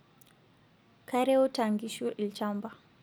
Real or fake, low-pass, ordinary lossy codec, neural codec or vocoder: real; none; none; none